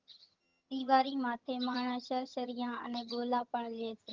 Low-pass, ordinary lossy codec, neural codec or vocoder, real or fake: 7.2 kHz; Opus, 24 kbps; vocoder, 22.05 kHz, 80 mel bands, HiFi-GAN; fake